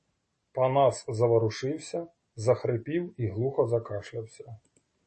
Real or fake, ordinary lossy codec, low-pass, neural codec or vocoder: real; MP3, 32 kbps; 10.8 kHz; none